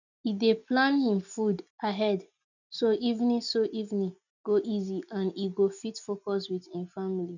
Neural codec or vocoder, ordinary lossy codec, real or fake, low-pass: none; none; real; 7.2 kHz